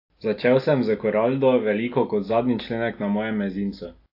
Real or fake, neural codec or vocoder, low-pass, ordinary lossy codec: real; none; 5.4 kHz; AAC, 32 kbps